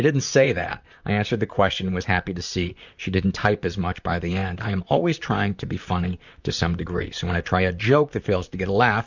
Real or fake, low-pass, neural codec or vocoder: fake; 7.2 kHz; vocoder, 44.1 kHz, 128 mel bands, Pupu-Vocoder